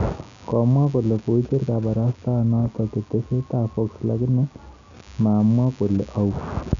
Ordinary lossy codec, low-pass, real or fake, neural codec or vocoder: none; 7.2 kHz; real; none